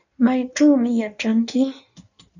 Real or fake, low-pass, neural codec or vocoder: fake; 7.2 kHz; codec, 16 kHz in and 24 kHz out, 1.1 kbps, FireRedTTS-2 codec